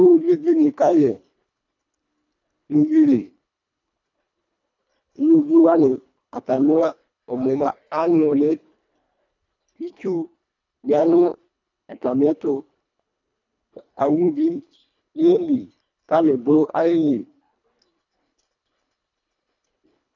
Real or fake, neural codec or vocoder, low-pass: fake; codec, 24 kHz, 1.5 kbps, HILCodec; 7.2 kHz